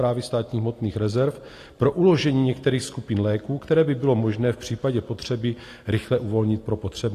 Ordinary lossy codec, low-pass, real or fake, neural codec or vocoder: AAC, 48 kbps; 14.4 kHz; real; none